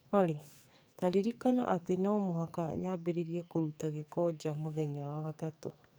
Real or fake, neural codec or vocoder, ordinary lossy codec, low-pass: fake; codec, 44.1 kHz, 2.6 kbps, SNAC; none; none